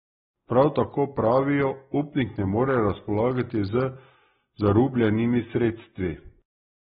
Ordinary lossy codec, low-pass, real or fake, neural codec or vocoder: AAC, 16 kbps; 19.8 kHz; real; none